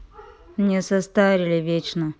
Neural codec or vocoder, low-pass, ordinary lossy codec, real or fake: none; none; none; real